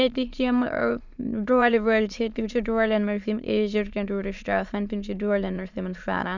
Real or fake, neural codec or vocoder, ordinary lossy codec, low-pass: fake; autoencoder, 22.05 kHz, a latent of 192 numbers a frame, VITS, trained on many speakers; none; 7.2 kHz